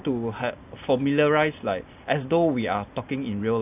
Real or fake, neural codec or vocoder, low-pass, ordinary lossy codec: real; none; 3.6 kHz; AAC, 32 kbps